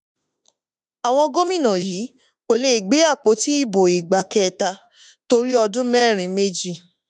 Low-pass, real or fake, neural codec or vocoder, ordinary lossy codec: 10.8 kHz; fake; autoencoder, 48 kHz, 32 numbers a frame, DAC-VAE, trained on Japanese speech; none